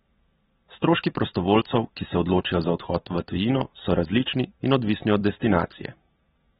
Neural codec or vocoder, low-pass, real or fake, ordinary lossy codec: none; 19.8 kHz; real; AAC, 16 kbps